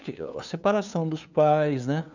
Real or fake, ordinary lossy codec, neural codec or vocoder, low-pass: fake; none; codec, 16 kHz, 2 kbps, FunCodec, trained on LibriTTS, 25 frames a second; 7.2 kHz